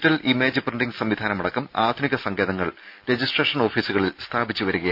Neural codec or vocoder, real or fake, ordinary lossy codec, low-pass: none; real; none; 5.4 kHz